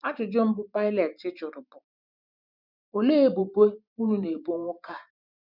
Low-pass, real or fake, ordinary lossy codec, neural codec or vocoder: 5.4 kHz; fake; none; vocoder, 44.1 kHz, 128 mel bands every 512 samples, BigVGAN v2